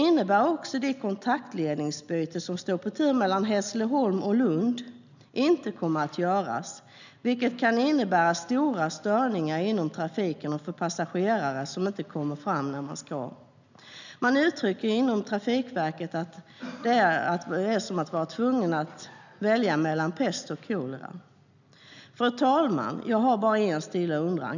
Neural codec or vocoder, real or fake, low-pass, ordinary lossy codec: none; real; 7.2 kHz; none